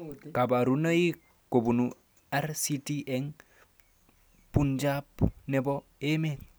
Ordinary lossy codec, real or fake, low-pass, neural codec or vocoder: none; real; none; none